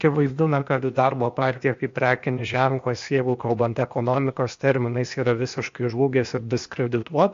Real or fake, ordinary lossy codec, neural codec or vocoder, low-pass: fake; MP3, 48 kbps; codec, 16 kHz, 0.8 kbps, ZipCodec; 7.2 kHz